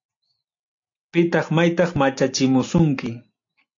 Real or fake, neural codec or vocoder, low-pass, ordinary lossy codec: real; none; 7.2 kHz; AAC, 48 kbps